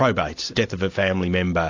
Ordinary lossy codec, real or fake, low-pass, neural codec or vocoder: AAC, 48 kbps; real; 7.2 kHz; none